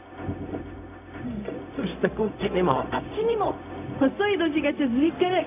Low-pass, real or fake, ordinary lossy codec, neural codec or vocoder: 3.6 kHz; fake; none; codec, 16 kHz, 0.4 kbps, LongCat-Audio-Codec